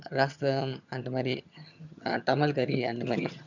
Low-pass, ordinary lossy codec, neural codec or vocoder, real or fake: 7.2 kHz; none; vocoder, 22.05 kHz, 80 mel bands, HiFi-GAN; fake